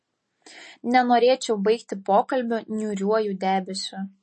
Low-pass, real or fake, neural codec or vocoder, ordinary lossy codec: 10.8 kHz; real; none; MP3, 32 kbps